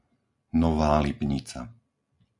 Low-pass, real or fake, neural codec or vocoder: 10.8 kHz; real; none